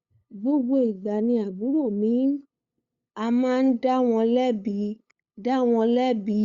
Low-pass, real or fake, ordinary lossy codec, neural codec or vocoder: 7.2 kHz; fake; Opus, 64 kbps; codec, 16 kHz, 8 kbps, FunCodec, trained on LibriTTS, 25 frames a second